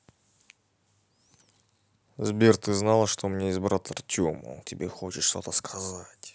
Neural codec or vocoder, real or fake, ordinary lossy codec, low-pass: none; real; none; none